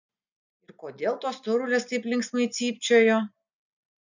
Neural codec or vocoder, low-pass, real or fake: none; 7.2 kHz; real